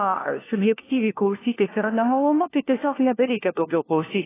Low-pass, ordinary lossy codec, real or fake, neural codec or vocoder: 3.6 kHz; AAC, 16 kbps; fake; codec, 16 kHz, 1 kbps, FunCodec, trained on LibriTTS, 50 frames a second